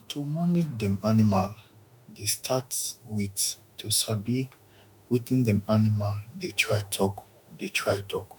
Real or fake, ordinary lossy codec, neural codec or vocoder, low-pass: fake; none; autoencoder, 48 kHz, 32 numbers a frame, DAC-VAE, trained on Japanese speech; 19.8 kHz